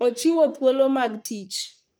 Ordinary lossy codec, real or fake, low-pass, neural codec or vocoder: none; fake; none; codec, 44.1 kHz, 3.4 kbps, Pupu-Codec